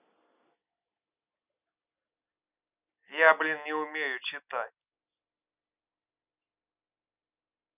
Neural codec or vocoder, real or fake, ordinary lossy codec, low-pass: none; real; none; 3.6 kHz